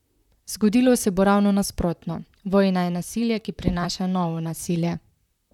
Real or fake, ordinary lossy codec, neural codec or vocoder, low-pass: fake; none; vocoder, 44.1 kHz, 128 mel bands, Pupu-Vocoder; 19.8 kHz